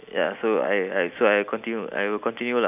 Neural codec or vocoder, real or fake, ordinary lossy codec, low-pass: none; real; none; 3.6 kHz